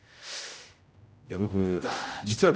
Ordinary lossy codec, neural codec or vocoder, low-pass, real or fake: none; codec, 16 kHz, 0.5 kbps, X-Codec, HuBERT features, trained on general audio; none; fake